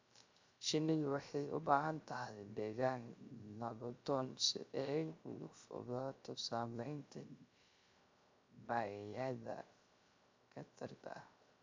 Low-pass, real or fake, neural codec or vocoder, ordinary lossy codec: 7.2 kHz; fake; codec, 16 kHz, 0.3 kbps, FocalCodec; MP3, 64 kbps